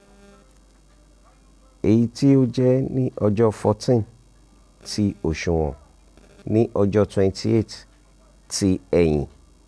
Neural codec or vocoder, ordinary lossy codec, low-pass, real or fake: none; none; none; real